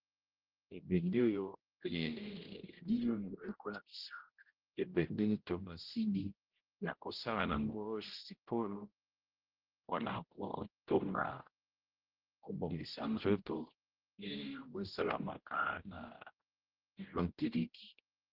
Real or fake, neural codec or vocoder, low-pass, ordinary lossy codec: fake; codec, 16 kHz, 0.5 kbps, X-Codec, HuBERT features, trained on general audio; 5.4 kHz; Opus, 16 kbps